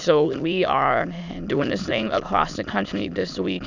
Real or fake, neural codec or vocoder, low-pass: fake; autoencoder, 22.05 kHz, a latent of 192 numbers a frame, VITS, trained on many speakers; 7.2 kHz